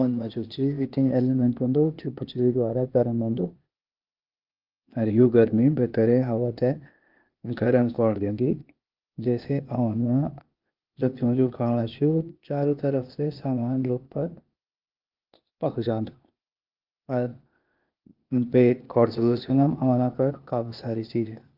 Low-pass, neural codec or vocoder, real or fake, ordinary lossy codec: 5.4 kHz; codec, 16 kHz, 0.8 kbps, ZipCodec; fake; Opus, 24 kbps